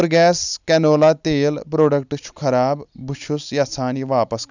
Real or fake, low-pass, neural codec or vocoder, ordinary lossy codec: real; 7.2 kHz; none; none